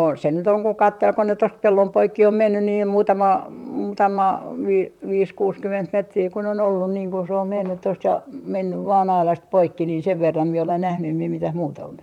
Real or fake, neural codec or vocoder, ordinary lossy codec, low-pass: fake; vocoder, 44.1 kHz, 128 mel bands, Pupu-Vocoder; MP3, 96 kbps; 19.8 kHz